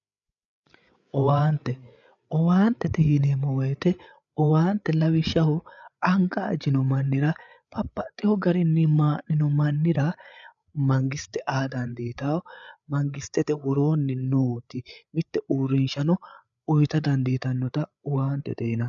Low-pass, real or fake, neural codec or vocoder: 7.2 kHz; fake; codec, 16 kHz, 8 kbps, FreqCodec, larger model